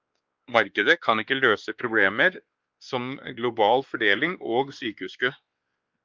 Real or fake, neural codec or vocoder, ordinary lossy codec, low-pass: fake; codec, 16 kHz, 2 kbps, X-Codec, WavLM features, trained on Multilingual LibriSpeech; Opus, 32 kbps; 7.2 kHz